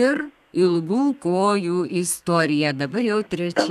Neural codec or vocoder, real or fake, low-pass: codec, 32 kHz, 1.9 kbps, SNAC; fake; 14.4 kHz